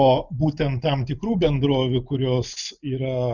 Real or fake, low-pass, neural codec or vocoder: real; 7.2 kHz; none